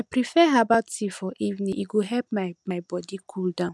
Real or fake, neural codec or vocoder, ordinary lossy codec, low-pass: real; none; none; none